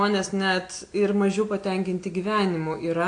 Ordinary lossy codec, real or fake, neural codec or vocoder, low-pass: AAC, 48 kbps; real; none; 9.9 kHz